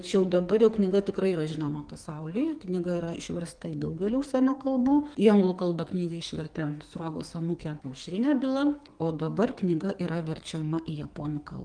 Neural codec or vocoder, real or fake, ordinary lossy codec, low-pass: codec, 44.1 kHz, 2.6 kbps, SNAC; fake; Opus, 24 kbps; 9.9 kHz